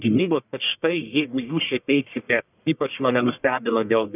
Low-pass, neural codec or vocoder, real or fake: 3.6 kHz; codec, 44.1 kHz, 1.7 kbps, Pupu-Codec; fake